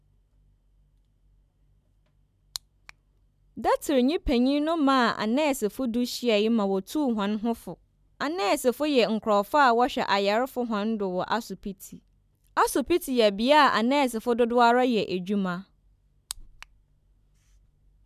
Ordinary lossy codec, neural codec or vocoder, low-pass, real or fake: none; none; 14.4 kHz; real